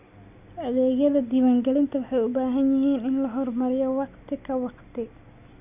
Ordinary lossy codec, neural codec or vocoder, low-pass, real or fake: none; none; 3.6 kHz; real